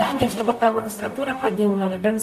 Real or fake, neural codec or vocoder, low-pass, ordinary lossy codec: fake; codec, 44.1 kHz, 0.9 kbps, DAC; 14.4 kHz; AAC, 96 kbps